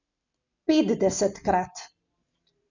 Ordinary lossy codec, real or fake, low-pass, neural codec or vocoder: none; real; 7.2 kHz; none